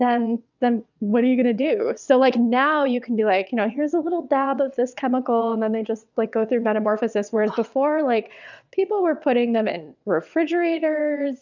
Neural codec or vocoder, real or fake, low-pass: vocoder, 22.05 kHz, 80 mel bands, WaveNeXt; fake; 7.2 kHz